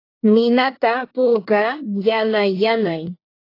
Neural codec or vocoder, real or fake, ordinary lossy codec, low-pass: codec, 24 kHz, 1 kbps, SNAC; fake; AAC, 32 kbps; 5.4 kHz